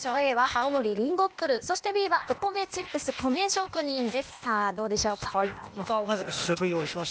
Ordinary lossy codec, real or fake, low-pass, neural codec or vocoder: none; fake; none; codec, 16 kHz, 0.8 kbps, ZipCodec